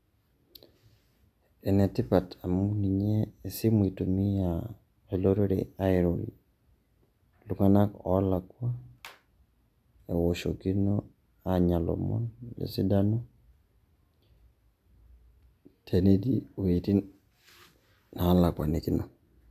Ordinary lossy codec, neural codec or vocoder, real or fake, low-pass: AAC, 96 kbps; none; real; 14.4 kHz